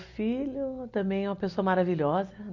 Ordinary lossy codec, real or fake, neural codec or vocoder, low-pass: none; real; none; 7.2 kHz